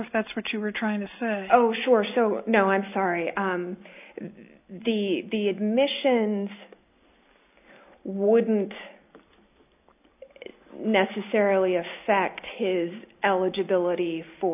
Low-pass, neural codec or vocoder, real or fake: 3.6 kHz; none; real